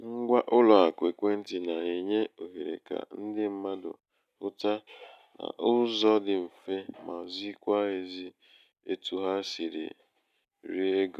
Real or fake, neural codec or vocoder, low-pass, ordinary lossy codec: real; none; 14.4 kHz; none